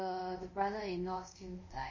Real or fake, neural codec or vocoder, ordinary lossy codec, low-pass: fake; codec, 24 kHz, 0.5 kbps, DualCodec; MP3, 32 kbps; 7.2 kHz